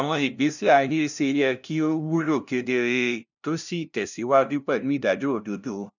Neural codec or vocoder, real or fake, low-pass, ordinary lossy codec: codec, 16 kHz, 0.5 kbps, FunCodec, trained on LibriTTS, 25 frames a second; fake; 7.2 kHz; none